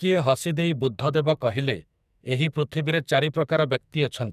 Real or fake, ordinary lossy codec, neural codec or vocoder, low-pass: fake; none; codec, 44.1 kHz, 2.6 kbps, SNAC; 14.4 kHz